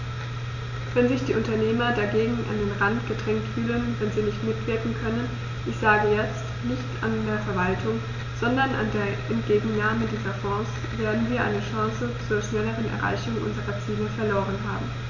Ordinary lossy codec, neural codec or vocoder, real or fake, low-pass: none; none; real; 7.2 kHz